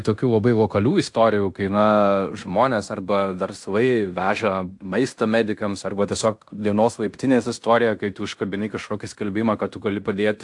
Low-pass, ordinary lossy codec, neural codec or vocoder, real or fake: 10.8 kHz; AAC, 64 kbps; codec, 16 kHz in and 24 kHz out, 0.9 kbps, LongCat-Audio-Codec, fine tuned four codebook decoder; fake